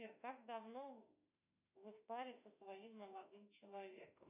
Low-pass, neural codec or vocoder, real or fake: 3.6 kHz; autoencoder, 48 kHz, 32 numbers a frame, DAC-VAE, trained on Japanese speech; fake